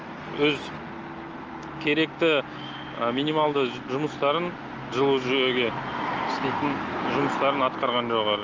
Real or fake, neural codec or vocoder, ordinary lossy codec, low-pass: real; none; Opus, 24 kbps; 7.2 kHz